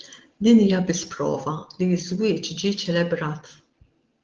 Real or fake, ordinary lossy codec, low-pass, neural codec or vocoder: real; Opus, 16 kbps; 7.2 kHz; none